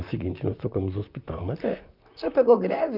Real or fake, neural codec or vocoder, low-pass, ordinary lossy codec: fake; vocoder, 44.1 kHz, 128 mel bands, Pupu-Vocoder; 5.4 kHz; none